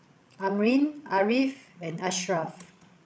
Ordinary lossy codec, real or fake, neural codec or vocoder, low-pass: none; fake; codec, 16 kHz, 16 kbps, FreqCodec, larger model; none